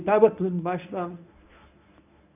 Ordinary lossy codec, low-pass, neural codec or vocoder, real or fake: none; 3.6 kHz; codec, 24 kHz, 0.9 kbps, WavTokenizer, medium speech release version 1; fake